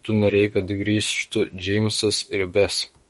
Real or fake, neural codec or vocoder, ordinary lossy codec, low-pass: fake; autoencoder, 48 kHz, 32 numbers a frame, DAC-VAE, trained on Japanese speech; MP3, 48 kbps; 19.8 kHz